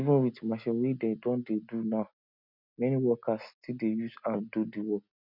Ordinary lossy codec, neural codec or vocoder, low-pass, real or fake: none; none; 5.4 kHz; real